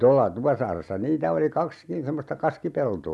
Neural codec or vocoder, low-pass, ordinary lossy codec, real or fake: none; none; none; real